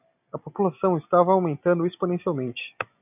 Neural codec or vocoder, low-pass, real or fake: none; 3.6 kHz; real